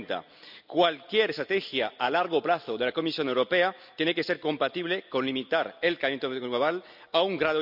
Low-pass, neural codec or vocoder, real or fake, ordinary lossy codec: 5.4 kHz; none; real; none